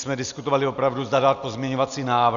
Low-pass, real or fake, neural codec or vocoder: 7.2 kHz; real; none